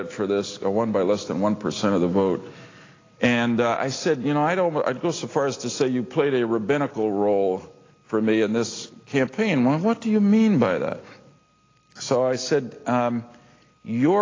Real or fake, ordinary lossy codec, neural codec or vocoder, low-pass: real; AAC, 32 kbps; none; 7.2 kHz